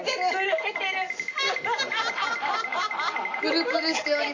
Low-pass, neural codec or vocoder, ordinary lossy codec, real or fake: 7.2 kHz; none; AAC, 32 kbps; real